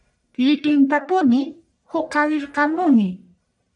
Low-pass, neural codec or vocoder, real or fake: 10.8 kHz; codec, 44.1 kHz, 1.7 kbps, Pupu-Codec; fake